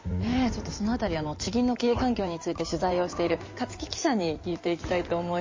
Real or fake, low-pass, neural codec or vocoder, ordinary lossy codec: fake; 7.2 kHz; codec, 16 kHz in and 24 kHz out, 2.2 kbps, FireRedTTS-2 codec; MP3, 32 kbps